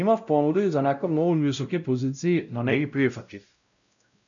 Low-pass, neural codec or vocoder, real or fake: 7.2 kHz; codec, 16 kHz, 0.5 kbps, X-Codec, WavLM features, trained on Multilingual LibriSpeech; fake